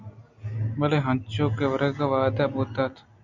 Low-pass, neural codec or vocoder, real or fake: 7.2 kHz; none; real